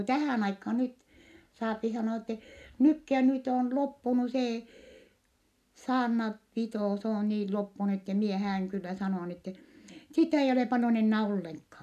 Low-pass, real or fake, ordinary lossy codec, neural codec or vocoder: 14.4 kHz; real; none; none